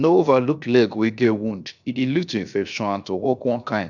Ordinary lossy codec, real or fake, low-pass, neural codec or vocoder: none; fake; 7.2 kHz; codec, 16 kHz, 0.7 kbps, FocalCodec